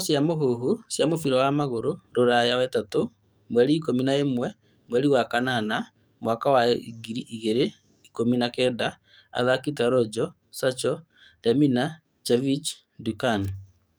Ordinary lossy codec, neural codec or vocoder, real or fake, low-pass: none; codec, 44.1 kHz, 7.8 kbps, DAC; fake; none